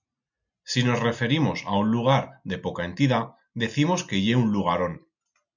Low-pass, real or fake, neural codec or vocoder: 7.2 kHz; real; none